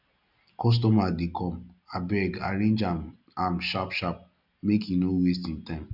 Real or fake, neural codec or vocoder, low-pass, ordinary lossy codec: real; none; 5.4 kHz; none